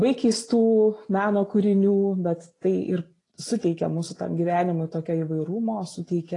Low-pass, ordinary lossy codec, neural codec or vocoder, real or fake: 10.8 kHz; AAC, 32 kbps; none; real